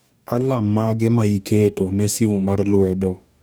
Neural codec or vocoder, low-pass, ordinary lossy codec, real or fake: codec, 44.1 kHz, 2.6 kbps, DAC; none; none; fake